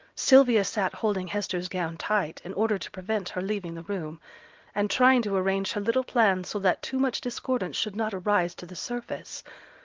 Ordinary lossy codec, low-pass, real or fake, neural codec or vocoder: Opus, 32 kbps; 7.2 kHz; real; none